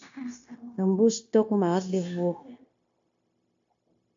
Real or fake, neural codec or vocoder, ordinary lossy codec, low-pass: fake; codec, 16 kHz, 0.9 kbps, LongCat-Audio-Codec; AAC, 48 kbps; 7.2 kHz